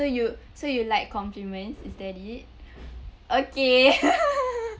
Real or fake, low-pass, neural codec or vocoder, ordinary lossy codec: real; none; none; none